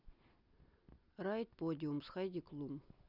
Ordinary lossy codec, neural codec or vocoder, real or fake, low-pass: none; none; real; 5.4 kHz